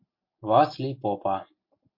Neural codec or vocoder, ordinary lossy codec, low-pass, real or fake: none; MP3, 32 kbps; 5.4 kHz; real